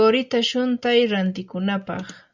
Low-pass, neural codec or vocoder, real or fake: 7.2 kHz; none; real